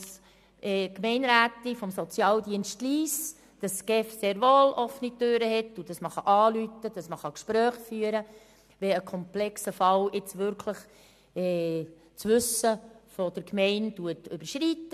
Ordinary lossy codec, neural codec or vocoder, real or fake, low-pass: MP3, 96 kbps; none; real; 14.4 kHz